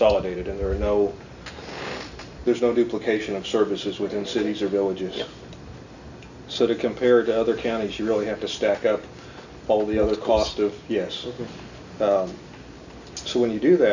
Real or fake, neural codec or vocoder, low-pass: real; none; 7.2 kHz